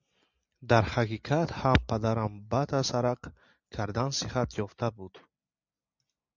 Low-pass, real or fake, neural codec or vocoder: 7.2 kHz; real; none